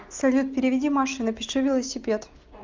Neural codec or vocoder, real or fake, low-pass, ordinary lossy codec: none; real; 7.2 kHz; Opus, 24 kbps